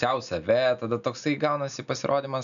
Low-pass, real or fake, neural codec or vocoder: 7.2 kHz; real; none